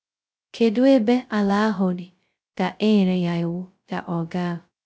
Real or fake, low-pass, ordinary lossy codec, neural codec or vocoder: fake; none; none; codec, 16 kHz, 0.2 kbps, FocalCodec